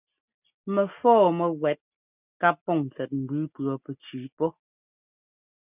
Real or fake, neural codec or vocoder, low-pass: real; none; 3.6 kHz